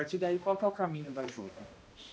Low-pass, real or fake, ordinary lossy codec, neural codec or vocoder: none; fake; none; codec, 16 kHz, 1 kbps, X-Codec, HuBERT features, trained on balanced general audio